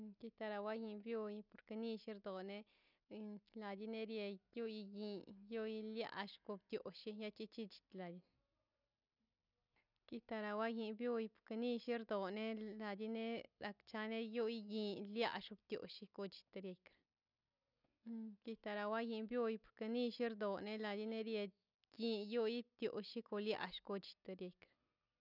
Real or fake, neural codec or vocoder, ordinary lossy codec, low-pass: real; none; none; 5.4 kHz